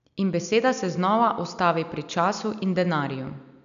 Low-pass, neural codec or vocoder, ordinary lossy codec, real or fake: 7.2 kHz; none; none; real